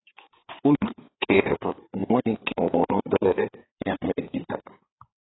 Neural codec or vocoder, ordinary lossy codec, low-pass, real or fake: codec, 16 kHz, 4 kbps, FreqCodec, larger model; AAC, 16 kbps; 7.2 kHz; fake